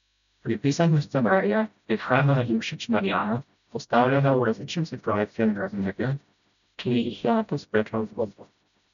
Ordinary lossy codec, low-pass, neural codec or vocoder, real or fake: none; 7.2 kHz; codec, 16 kHz, 0.5 kbps, FreqCodec, smaller model; fake